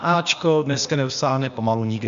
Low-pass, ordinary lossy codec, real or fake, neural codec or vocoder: 7.2 kHz; MP3, 64 kbps; fake; codec, 16 kHz, 0.8 kbps, ZipCodec